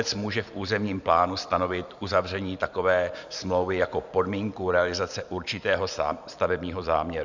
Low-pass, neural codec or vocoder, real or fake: 7.2 kHz; vocoder, 44.1 kHz, 128 mel bands every 512 samples, BigVGAN v2; fake